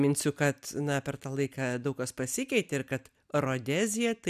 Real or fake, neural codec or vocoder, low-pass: real; none; 14.4 kHz